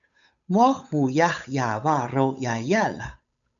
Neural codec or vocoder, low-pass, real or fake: codec, 16 kHz, 8 kbps, FunCodec, trained on Chinese and English, 25 frames a second; 7.2 kHz; fake